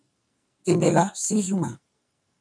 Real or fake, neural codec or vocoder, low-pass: fake; codec, 44.1 kHz, 2.6 kbps, SNAC; 9.9 kHz